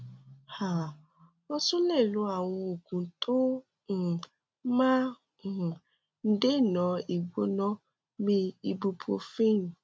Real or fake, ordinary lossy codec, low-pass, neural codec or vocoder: real; none; none; none